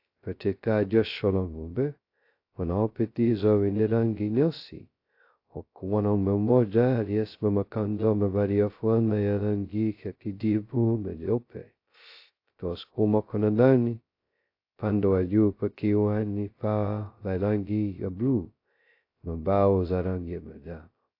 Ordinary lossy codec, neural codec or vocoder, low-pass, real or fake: AAC, 32 kbps; codec, 16 kHz, 0.2 kbps, FocalCodec; 5.4 kHz; fake